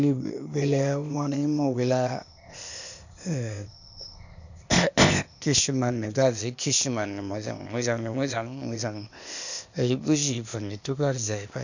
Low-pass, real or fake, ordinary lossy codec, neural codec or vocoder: 7.2 kHz; fake; none; codec, 16 kHz, 0.8 kbps, ZipCodec